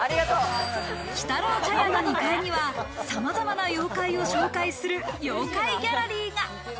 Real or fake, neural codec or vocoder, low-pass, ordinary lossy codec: real; none; none; none